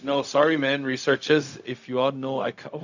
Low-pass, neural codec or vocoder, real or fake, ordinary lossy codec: 7.2 kHz; codec, 16 kHz, 0.4 kbps, LongCat-Audio-Codec; fake; none